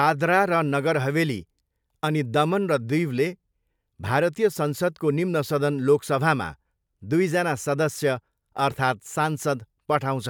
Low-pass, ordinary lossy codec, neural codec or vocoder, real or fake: none; none; none; real